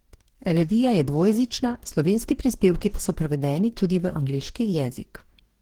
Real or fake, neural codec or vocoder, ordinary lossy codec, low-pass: fake; codec, 44.1 kHz, 2.6 kbps, DAC; Opus, 16 kbps; 19.8 kHz